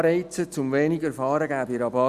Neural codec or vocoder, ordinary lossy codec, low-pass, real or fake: none; Opus, 64 kbps; 14.4 kHz; real